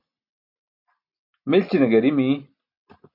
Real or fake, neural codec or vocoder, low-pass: real; none; 5.4 kHz